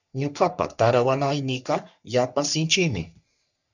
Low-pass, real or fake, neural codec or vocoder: 7.2 kHz; fake; codec, 44.1 kHz, 3.4 kbps, Pupu-Codec